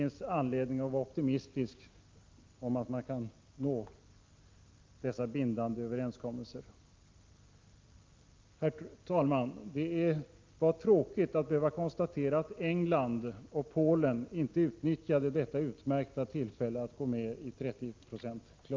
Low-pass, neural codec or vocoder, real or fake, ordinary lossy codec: 7.2 kHz; none; real; Opus, 32 kbps